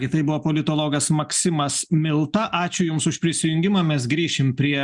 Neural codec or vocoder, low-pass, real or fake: none; 10.8 kHz; real